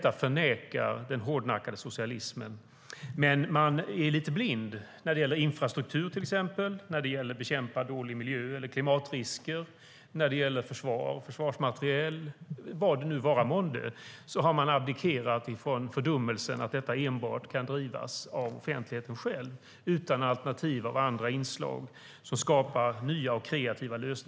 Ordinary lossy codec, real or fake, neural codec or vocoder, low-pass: none; real; none; none